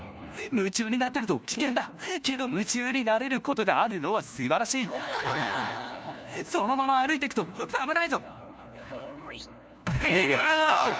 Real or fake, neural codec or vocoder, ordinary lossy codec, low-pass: fake; codec, 16 kHz, 1 kbps, FunCodec, trained on LibriTTS, 50 frames a second; none; none